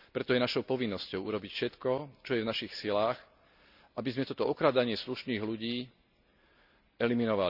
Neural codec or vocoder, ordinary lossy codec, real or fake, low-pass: none; none; real; 5.4 kHz